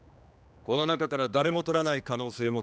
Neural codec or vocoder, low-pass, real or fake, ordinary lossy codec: codec, 16 kHz, 2 kbps, X-Codec, HuBERT features, trained on general audio; none; fake; none